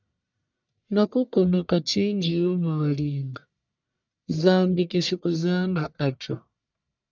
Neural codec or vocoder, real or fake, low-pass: codec, 44.1 kHz, 1.7 kbps, Pupu-Codec; fake; 7.2 kHz